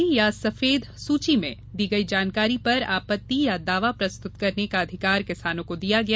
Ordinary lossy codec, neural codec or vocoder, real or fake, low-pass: none; none; real; none